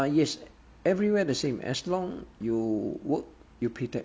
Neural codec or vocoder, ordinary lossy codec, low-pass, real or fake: none; none; none; real